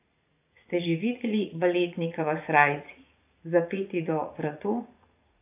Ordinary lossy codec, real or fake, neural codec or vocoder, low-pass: AAC, 32 kbps; fake; vocoder, 44.1 kHz, 80 mel bands, Vocos; 3.6 kHz